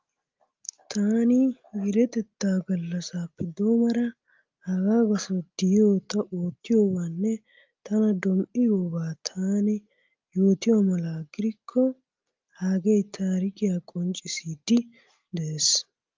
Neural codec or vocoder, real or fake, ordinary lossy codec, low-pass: none; real; Opus, 24 kbps; 7.2 kHz